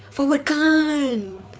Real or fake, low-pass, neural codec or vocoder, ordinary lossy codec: fake; none; codec, 16 kHz, 4 kbps, FreqCodec, larger model; none